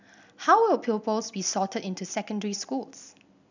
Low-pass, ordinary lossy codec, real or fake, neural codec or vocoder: 7.2 kHz; none; real; none